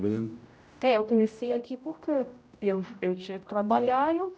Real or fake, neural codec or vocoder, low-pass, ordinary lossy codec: fake; codec, 16 kHz, 0.5 kbps, X-Codec, HuBERT features, trained on general audio; none; none